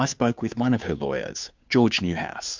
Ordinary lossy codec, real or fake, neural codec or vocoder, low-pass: MP3, 64 kbps; fake; codec, 16 kHz, 2 kbps, FunCodec, trained on Chinese and English, 25 frames a second; 7.2 kHz